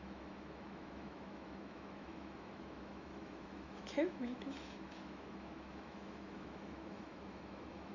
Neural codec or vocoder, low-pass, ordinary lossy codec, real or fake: autoencoder, 48 kHz, 128 numbers a frame, DAC-VAE, trained on Japanese speech; 7.2 kHz; none; fake